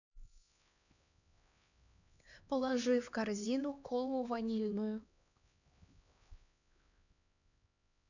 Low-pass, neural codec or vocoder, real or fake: 7.2 kHz; codec, 16 kHz, 2 kbps, X-Codec, HuBERT features, trained on LibriSpeech; fake